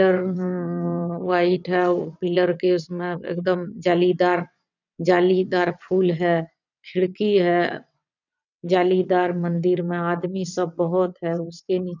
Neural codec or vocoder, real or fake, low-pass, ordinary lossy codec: vocoder, 22.05 kHz, 80 mel bands, WaveNeXt; fake; 7.2 kHz; none